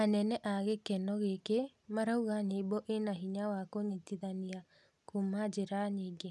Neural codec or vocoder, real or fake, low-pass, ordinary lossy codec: none; real; none; none